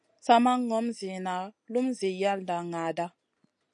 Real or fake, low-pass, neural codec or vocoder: real; 9.9 kHz; none